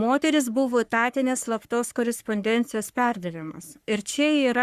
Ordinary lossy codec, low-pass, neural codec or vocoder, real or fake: Opus, 64 kbps; 14.4 kHz; codec, 44.1 kHz, 3.4 kbps, Pupu-Codec; fake